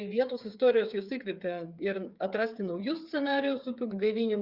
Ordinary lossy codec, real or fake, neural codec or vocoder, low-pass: Opus, 64 kbps; fake; codec, 16 kHz, 8 kbps, FreqCodec, smaller model; 5.4 kHz